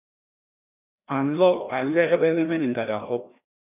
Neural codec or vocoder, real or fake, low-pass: codec, 16 kHz, 1 kbps, FreqCodec, larger model; fake; 3.6 kHz